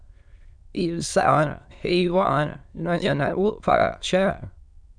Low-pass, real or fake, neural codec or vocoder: 9.9 kHz; fake; autoencoder, 22.05 kHz, a latent of 192 numbers a frame, VITS, trained on many speakers